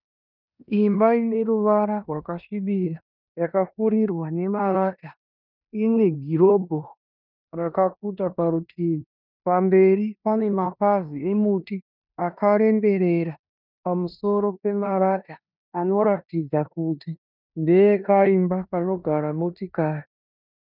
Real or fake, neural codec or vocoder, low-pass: fake; codec, 16 kHz in and 24 kHz out, 0.9 kbps, LongCat-Audio-Codec, four codebook decoder; 5.4 kHz